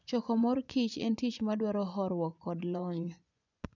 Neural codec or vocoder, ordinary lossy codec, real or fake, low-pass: vocoder, 24 kHz, 100 mel bands, Vocos; none; fake; 7.2 kHz